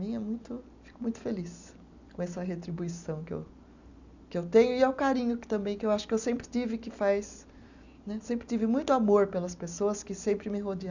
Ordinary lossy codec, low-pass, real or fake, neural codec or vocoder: none; 7.2 kHz; real; none